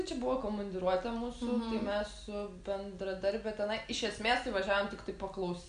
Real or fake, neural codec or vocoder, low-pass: real; none; 9.9 kHz